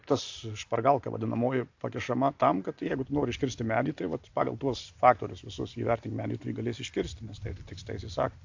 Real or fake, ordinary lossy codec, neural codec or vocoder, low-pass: real; AAC, 48 kbps; none; 7.2 kHz